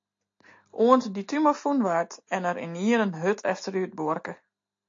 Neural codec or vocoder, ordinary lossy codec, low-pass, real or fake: none; AAC, 48 kbps; 7.2 kHz; real